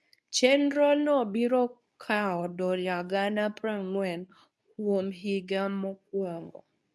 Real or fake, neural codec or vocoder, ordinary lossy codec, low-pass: fake; codec, 24 kHz, 0.9 kbps, WavTokenizer, medium speech release version 2; none; none